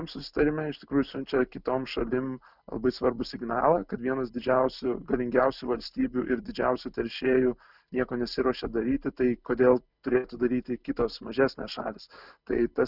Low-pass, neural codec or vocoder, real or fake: 5.4 kHz; none; real